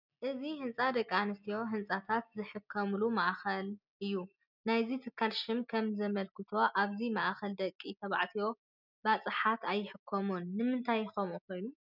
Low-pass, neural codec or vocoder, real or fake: 5.4 kHz; none; real